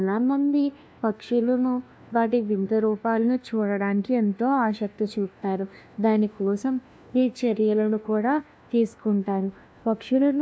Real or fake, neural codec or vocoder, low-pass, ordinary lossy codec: fake; codec, 16 kHz, 1 kbps, FunCodec, trained on LibriTTS, 50 frames a second; none; none